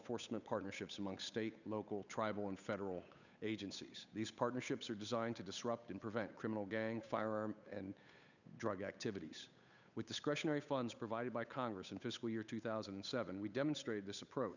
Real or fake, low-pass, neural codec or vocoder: fake; 7.2 kHz; codec, 16 kHz, 8 kbps, FunCodec, trained on Chinese and English, 25 frames a second